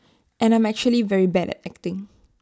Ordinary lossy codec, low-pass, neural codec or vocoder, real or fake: none; none; none; real